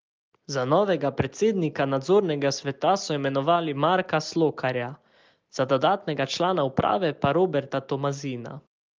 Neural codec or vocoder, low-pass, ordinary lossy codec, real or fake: none; 7.2 kHz; Opus, 32 kbps; real